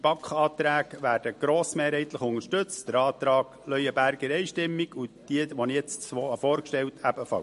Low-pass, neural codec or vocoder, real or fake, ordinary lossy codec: 14.4 kHz; none; real; MP3, 48 kbps